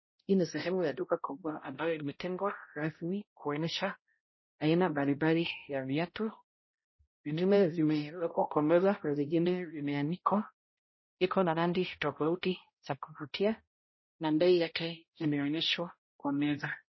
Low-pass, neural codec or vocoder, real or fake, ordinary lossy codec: 7.2 kHz; codec, 16 kHz, 0.5 kbps, X-Codec, HuBERT features, trained on balanced general audio; fake; MP3, 24 kbps